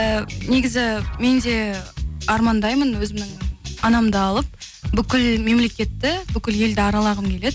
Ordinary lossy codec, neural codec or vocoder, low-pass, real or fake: none; none; none; real